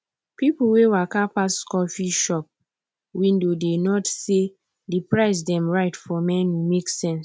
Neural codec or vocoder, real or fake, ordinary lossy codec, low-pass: none; real; none; none